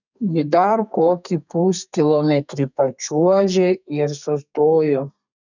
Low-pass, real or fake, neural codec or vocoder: 7.2 kHz; fake; codec, 32 kHz, 1.9 kbps, SNAC